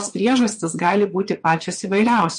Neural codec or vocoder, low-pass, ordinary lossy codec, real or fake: vocoder, 22.05 kHz, 80 mel bands, Vocos; 9.9 kHz; MP3, 48 kbps; fake